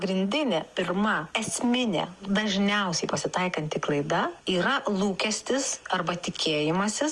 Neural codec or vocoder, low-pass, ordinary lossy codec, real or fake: none; 10.8 kHz; Opus, 32 kbps; real